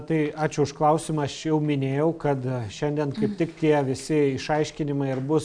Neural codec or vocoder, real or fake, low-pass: none; real; 9.9 kHz